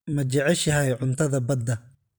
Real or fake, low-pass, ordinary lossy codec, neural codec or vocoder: fake; none; none; vocoder, 44.1 kHz, 128 mel bands every 512 samples, BigVGAN v2